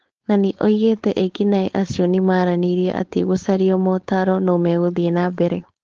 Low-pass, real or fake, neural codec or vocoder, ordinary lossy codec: 7.2 kHz; fake; codec, 16 kHz, 4.8 kbps, FACodec; Opus, 16 kbps